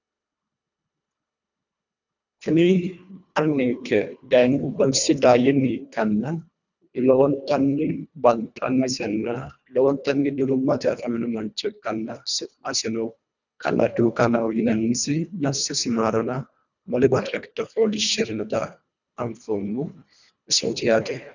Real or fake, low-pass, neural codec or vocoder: fake; 7.2 kHz; codec, 24 kHz, 1.5 kbps, HILCodec